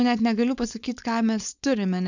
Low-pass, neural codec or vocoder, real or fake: 7.2 kHz; codec, 16 kHz, 4.8 kbps, FACodec; fake